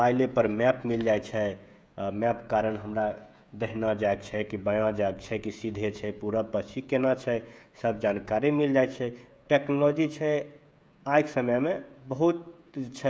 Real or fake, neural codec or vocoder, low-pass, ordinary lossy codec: fake; codec, 16 kHz, 16 kbps, FreqCodec, smaller model; none; none